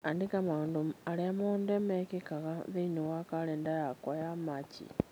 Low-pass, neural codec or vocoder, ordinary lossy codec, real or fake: none; none; none; real